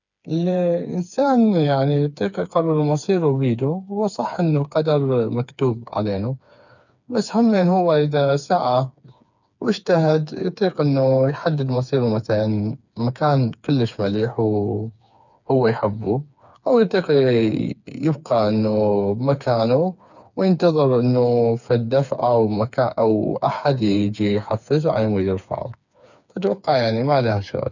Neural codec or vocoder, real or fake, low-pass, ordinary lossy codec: codec, 16 kHz, 4 kbps, FreqCodec, smaller model; fake; 7.2 kHz; none